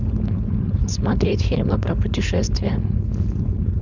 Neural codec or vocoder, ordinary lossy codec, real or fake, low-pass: codec, 16 kHz, 4.8 kbps, FACodec; none; fake; 7.2 kHz